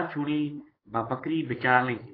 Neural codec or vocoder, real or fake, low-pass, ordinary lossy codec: codec, 16 kHz, 4.8 kbps, FACodec; fake; 5.4 kHz; AAC, 24 kbps